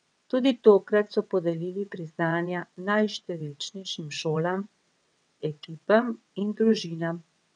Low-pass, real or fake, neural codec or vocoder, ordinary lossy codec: 9.9 kHz; fake; vocoder, 22.05 kHz, 80 mel bands, WaveNeXt; none